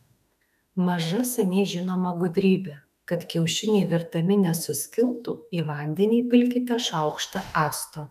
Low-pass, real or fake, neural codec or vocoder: 14.4 kHz; fake; autoencoder, 48 kHz, 32 numbers a frame, DAC-VAE, trained on Japanese speech